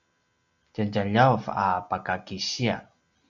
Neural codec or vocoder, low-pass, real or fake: none; 7.2 kHz; real